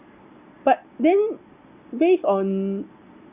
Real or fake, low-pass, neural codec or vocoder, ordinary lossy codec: real; 3.6 kHz; none; Opus, 64 kbps